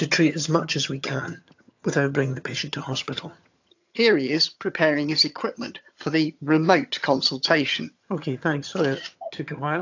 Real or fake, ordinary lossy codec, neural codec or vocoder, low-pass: fake; AAC, 48 kbps; vocoder, 22.05 kHz, 80 mel bands, HiFi-GAN; 7.2 kHz